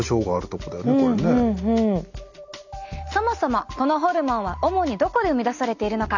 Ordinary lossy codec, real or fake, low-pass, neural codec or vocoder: none; real; 7.2 kHz; none